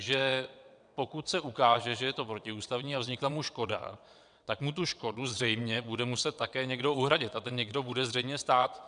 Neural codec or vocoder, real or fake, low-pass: vocoder, 22.05 kHz, 80 mel bands, WaveNeXt; fake; 9.9 kHz